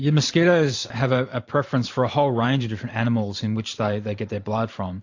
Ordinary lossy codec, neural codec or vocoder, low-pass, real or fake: AAC, 48 kbps; vocoder, 44.1 kHz, 128 mel bands every 512 samples, BigVGAN v2; 7.2 kHz; fake